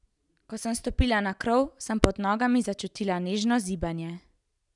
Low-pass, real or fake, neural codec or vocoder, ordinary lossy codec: 10.8 kHz; real; none; none